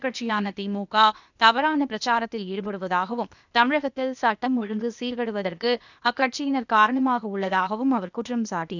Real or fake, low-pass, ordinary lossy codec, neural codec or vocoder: fake; 7.2 kHz; none; codec, 16 kHz, 0.8 kbps, ZipCodec